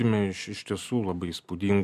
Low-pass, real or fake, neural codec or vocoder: 14.4 kHz; real; none